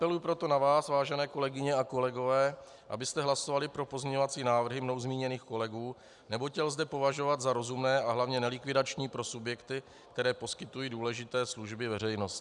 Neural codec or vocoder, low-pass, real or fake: none; 10.8 kHz; real